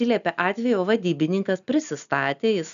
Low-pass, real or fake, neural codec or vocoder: 7.2 kHz; real; none